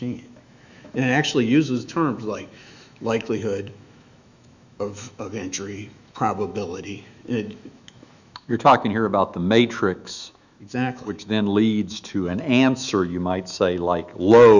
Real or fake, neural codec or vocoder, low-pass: fake; autoencoder, 48 kHz, 128 numbers a frame, DAC-VAE, trained on Japanese speech; 7.2 kHz